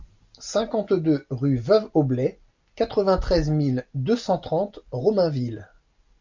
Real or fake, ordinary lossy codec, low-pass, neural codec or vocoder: real; MP3, 64 kbps; 7.2 kHz; none